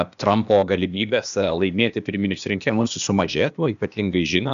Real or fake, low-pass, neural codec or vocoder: fake; 7.2 kHz; codec, 16 kHz, 0.8 kbps, ZipCodec